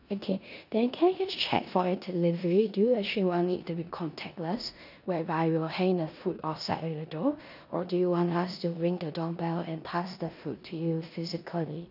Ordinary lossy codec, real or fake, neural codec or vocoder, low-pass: none; fake; codec, 16 kHz in and 24 kHz out, 0.9 kbps, LongCat-Audio-Codec, four codebook decoder; 5.4 kHz